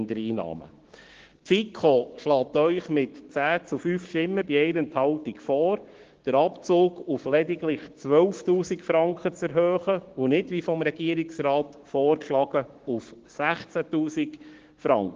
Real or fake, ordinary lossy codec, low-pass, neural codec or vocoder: fake; Opus, 16 kbps; 7.2 kHz; codec, 16 kHz, 6 kbps, DAC